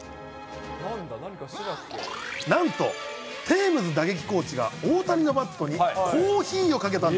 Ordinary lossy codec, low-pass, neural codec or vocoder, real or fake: none; none; none; real